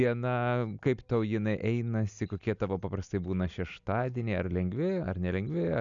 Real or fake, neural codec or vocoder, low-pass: real; none; 7.2 kHz